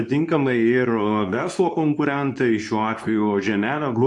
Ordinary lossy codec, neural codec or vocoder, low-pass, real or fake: AAC, 64 kbps; codec, 24 kHz, 0.9 kbps, WavTokenizer, medium speech release version 2; 10.8 kHz; fake